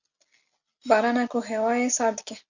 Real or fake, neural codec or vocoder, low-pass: real; none; 7.2 kHz